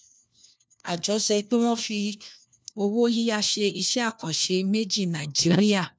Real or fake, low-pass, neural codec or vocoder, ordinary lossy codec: fake; none; codec, 16 kHz, 1 kbps, FunCodec, trained on LibriTTS, 50 frames a second; none